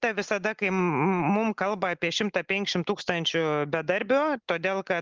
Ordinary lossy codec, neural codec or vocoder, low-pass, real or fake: Opus, 32 kbps; none; 7.2 kHz; real